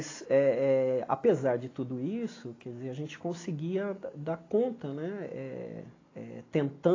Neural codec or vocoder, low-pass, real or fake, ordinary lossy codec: none; 7.2 kHz; real; AAC, 32 kbps